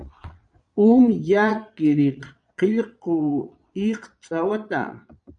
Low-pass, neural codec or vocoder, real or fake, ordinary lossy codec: 9.9 kHz; vocoder, 22.05 kHz, 80 mel bands, Vocos; fake; MP3, 96 kbps